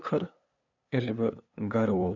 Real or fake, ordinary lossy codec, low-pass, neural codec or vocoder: fake; none; 7.2 kHz; codec, 16 kHz, 2 kbps, FunCodec, trained on LibriTTS, 25 frames a second